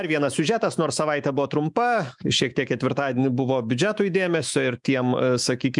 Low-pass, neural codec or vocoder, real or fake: 10.8 kHz; autoencoder, 48 kHz, 128 numbers a frame, DAC-VAE, trained on Japanese speech; fake